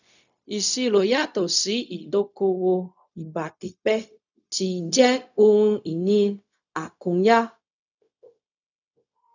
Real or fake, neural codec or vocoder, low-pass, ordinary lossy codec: fake; codec, 16 kHz, 0.4 kbps, LongCat-Audio-Codec; 7.2 kHz; none